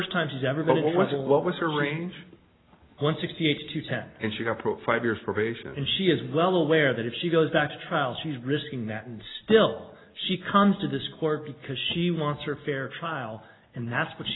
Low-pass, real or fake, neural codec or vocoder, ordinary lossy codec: 7.2 kHz; real; none; AAC, 16 kbps